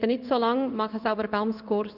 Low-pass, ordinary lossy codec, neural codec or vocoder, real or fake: 5.4 kHz; none; codec, 16 kHz in and 24 kHz out, 1 kbps, XY-Tokenizer; fake